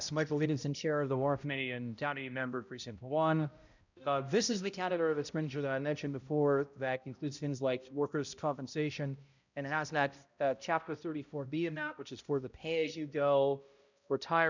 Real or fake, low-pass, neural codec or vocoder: fake; 7.2 kHz; codec, 16 kHz, 0.5 kbps, X-Codec, HuBERT features, trained on balanced general audio